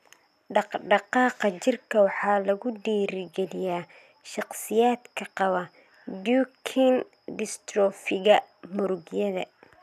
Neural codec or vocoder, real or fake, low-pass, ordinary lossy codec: none; real; 14.4 kHz; none